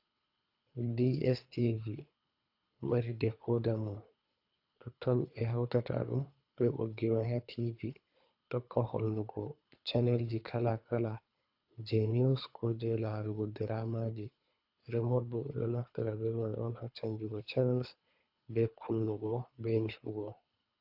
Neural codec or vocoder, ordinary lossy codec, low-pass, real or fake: codec, 24 kHz, 3 kbps, HILCodec; AAC, 48 kbps; 5.4 kHz; fake